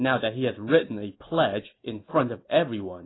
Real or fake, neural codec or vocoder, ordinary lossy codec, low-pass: real; none; AAC, 16 kbps; 7.2 kHz